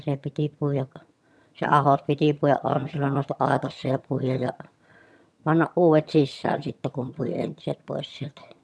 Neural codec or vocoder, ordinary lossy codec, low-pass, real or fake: vocoder, 22.05 kHz, 80 mel bands, HiFi-GAN; none; none; fake